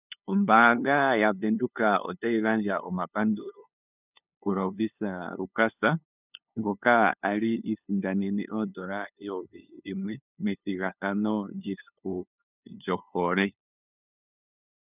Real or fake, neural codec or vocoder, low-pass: fake; codec, 16 kHz, 2 kbps, FunCodec, trained on LibriTTS, 25 frames a second; 3.6 kHz